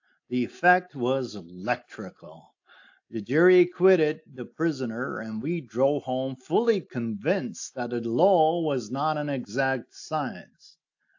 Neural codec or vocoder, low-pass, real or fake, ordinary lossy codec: none; 7.2 kHz; real; AAC, 48 kbps